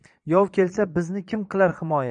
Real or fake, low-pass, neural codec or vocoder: fake; 9.9 kHz; vocoder, 22.05 kHz, 80 mel bands, Vocos